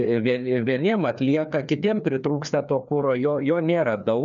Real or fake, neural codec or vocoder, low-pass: fake; codec, 16 kHz, 2 kbps, FreqCodec, larger model; 7.2 kHz